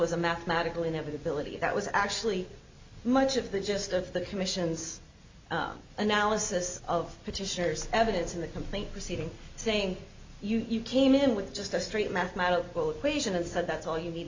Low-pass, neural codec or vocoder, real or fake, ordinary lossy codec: 7.2 kHz; none; real; MP3, 48 kbps